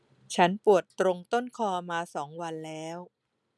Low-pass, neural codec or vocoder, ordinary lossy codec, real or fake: none; none; none; real